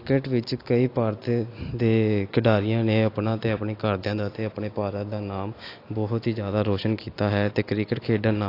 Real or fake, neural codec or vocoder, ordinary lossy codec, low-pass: real; none; AAC, 32 kbps; 5.4 kHz